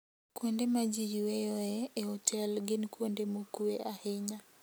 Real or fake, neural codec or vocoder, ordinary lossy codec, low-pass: real; none; none; none